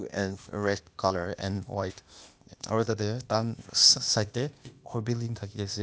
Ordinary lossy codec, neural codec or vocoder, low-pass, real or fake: none; codec, 16 kHz, 0.8 kbps, ZipCodec; none; fake